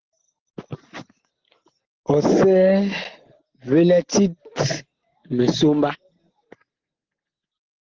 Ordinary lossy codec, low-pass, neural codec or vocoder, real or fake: Opus, 16 kbps; 7.2 kHz; none; real